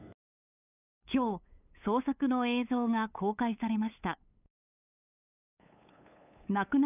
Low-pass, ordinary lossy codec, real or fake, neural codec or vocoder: 3.6 kHz; none; fake; codec, 16 kHz, 8 kbps, FunCodec, trained on LibriTTS, 25 frames a second